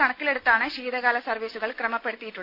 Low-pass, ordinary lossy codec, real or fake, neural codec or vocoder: 5.4 kHz; none; real; none